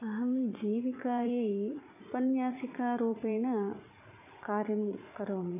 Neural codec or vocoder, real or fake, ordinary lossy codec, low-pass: vocoder, 44.1 kHz, 80 mel bands, Vocos; fake; none; 3.6 kHz